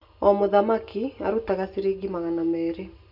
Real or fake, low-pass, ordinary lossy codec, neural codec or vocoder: real; 5.4 kHz; none; none